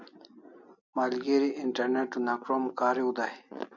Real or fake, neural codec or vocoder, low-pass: real; none; 7.2 kHz